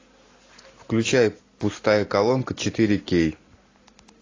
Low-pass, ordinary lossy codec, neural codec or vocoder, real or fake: 7.2 kHz; AAC, 32 kbps; none; real